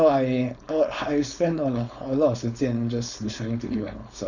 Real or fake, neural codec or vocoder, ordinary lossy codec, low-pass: fake; codec, 16 kHz, 4.8 kbps, FACodec; none; 7.2 kHz